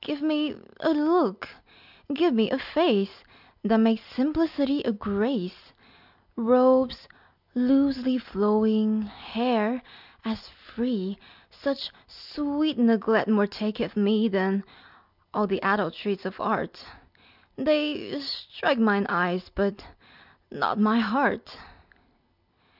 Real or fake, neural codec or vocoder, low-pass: real; none; 5.4 kHz